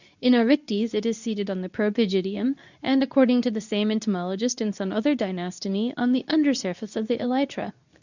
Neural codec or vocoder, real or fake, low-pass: codec, 24 kHz, 0.9 kbps, WavTokenizer, medium speech release version 2; fake; 7.2 kHz